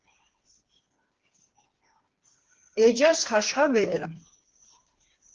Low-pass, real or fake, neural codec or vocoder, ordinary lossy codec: 7.2 kHz; fake; codec, 16 kHz, 4 kbps, FreqCodec, smaller model; Opus, 16 kbps